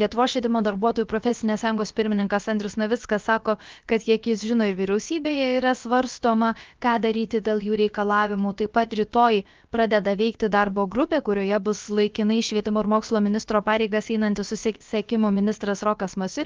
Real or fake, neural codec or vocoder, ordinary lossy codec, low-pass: fake; codec, 16 kHz, about 1 kbps, DyCAST, with the encoder's durations; Opus, 32 kbps; 7.2 kHz